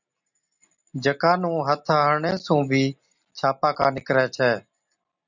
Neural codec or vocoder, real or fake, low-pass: none; real; 7.2 kHz